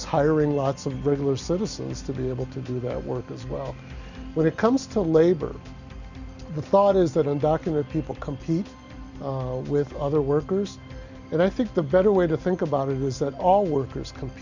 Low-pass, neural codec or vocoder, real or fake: 7.2 kHz; none; real